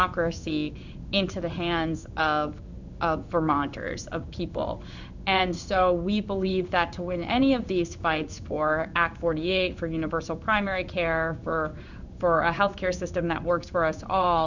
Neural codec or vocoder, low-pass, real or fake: codec, 16 kHz in and 24 kHz out, 1 kbps, XY-Tokenizer; 7.2 kHz; fake